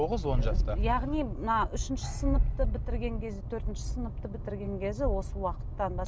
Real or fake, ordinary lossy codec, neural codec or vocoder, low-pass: real; none; none; none